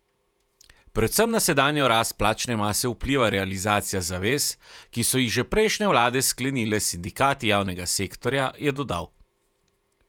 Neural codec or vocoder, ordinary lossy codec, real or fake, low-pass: vocoder, 48 kHz, 128 mel bands, Vocos; none; fake; 19.8 kHz